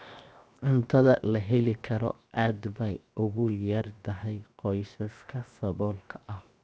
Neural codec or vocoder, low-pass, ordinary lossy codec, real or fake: codec, 16 kHz, 0.7 kbps, FocalCodec; none; none; fake